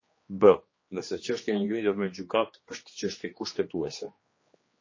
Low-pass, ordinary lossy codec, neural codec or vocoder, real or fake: 7.2 kHz; MP3, 32 kbps; codec, 16 kHz, 2 kbps, X-Codec, HuBERT features, trained on general audio; fake